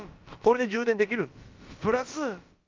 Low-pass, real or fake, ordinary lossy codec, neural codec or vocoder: 7.2 kHz; fake; Opus, 24 kbps; codec, 16 kHz, about 1 kbps, DyCAST, with the encoder's durations